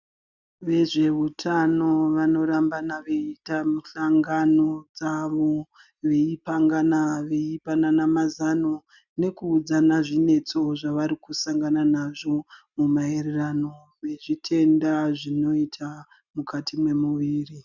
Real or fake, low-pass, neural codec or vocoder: real; 7.2 kHz; none